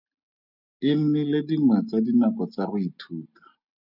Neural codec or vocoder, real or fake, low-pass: none; real; 5.4 kHz